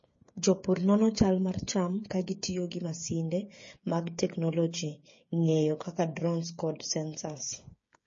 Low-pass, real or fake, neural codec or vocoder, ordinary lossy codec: 7.2 kHz; fake; codec, 16 kHz, 8 kbps, FreqCodec, smaller model; MP3, 32 kbps